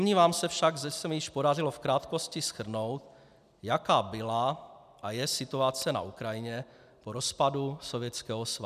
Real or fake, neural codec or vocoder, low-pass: real; none; 14.4 kHz